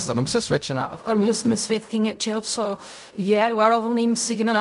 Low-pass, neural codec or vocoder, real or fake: 10.8 kHz; codec, 16 kHz in and 24 kHz out, 0.4 kbps, LongCat-Audio-Codec, fine tuned four codebook decoder; fake